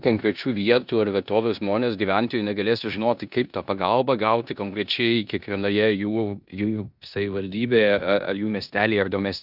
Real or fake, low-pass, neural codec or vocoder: fake; 5.4 kHz; codec, 16 kHz in and 24 kHz out, 0.9 kbps, LongCat-Audio-Codec, four codebook decoder